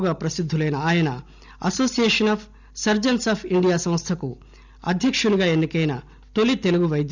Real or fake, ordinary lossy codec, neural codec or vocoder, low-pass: real; none; none; 7.2 kHz